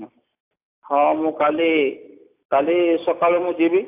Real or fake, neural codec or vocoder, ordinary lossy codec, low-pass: real; none; AAC, 24 kbps; 3.6 kHz